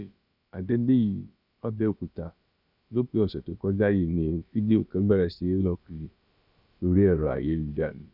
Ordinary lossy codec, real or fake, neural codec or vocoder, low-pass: none; fake; codec, 16 kHz, about 1 kbps, DyCAST, with the encoder's durations; 5.4 kHz